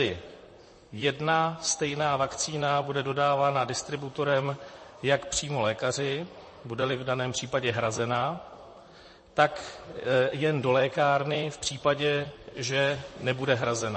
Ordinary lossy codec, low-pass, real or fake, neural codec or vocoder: MP3, 32 kbps; 10.8 kHz; fake; vocoder, 44.1 kHz, 128 mel bands, Pupu-Vocoder